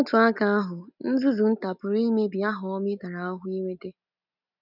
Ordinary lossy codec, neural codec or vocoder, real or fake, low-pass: none; none; real; 5.4 kHz